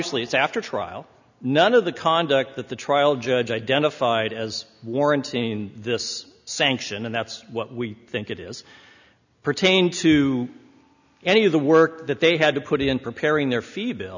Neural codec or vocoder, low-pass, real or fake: none; 7.2 kHz; real